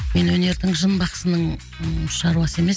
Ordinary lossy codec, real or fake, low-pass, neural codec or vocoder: none; real; none; none